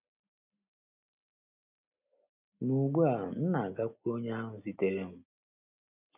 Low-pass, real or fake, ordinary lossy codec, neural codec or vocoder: 3.6 kHz; real; none; none